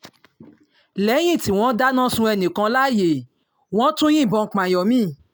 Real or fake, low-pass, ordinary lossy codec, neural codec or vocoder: real; none; none; none